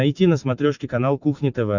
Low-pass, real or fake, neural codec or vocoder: 7.2 kHz; real; none